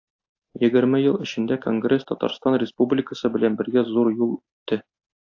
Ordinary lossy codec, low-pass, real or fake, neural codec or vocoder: AAC, 48 kbps; 7.2 kHz; real; none